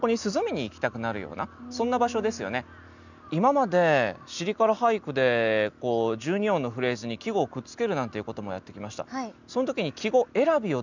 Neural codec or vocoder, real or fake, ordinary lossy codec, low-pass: none; real; none; 7.2 kHz